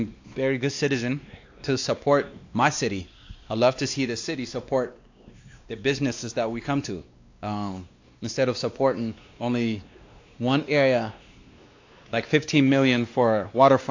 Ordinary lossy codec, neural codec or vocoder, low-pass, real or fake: AAC, 48 kbps; codec, 16 kHz, 2 kbps, X-Codec, WavLM features, trained on Multilingual LibriSpeech; 7.2 kHz; fake